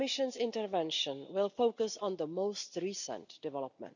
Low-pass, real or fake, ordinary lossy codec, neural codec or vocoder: 7.2 kHz; real; none; none